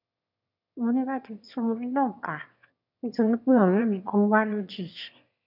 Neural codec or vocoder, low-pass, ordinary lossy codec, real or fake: autoencoder, 22.05 kHz, a latent of 192 numbers a frame, VITS, trained on one speaker; 5.4 kHz; none; fake